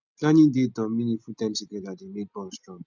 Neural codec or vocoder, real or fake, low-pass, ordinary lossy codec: none; real; 7.2 kHz; none